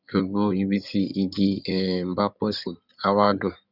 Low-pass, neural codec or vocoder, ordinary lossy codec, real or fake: 5.4 kHz; codec, 16 kHz in and 24 kHz out, 2.2 kbps, FireRedTTS-2 codec; none; fake